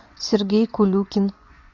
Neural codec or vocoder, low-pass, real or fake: vocoder, 24 kHz, 100 mel bands, Vocos; 7.2 kHz; fake